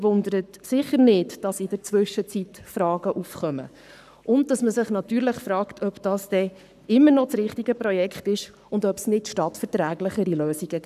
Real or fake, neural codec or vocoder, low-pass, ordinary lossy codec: fake; codec, 44.1 kHz, 7.8 kbps, Pupu-Codec; 14.4 kHz; none